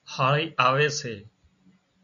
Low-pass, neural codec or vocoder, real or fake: 7.2 kHz; none; real